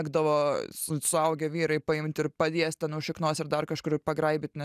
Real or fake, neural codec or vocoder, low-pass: real; none; 14.4 kHz